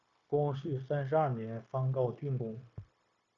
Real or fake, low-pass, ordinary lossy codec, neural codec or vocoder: fake; 7.2 kHz; Opus, 64 kbps; codec, 16 kHz, 0.9 kbps, LongCat-Audio-Codec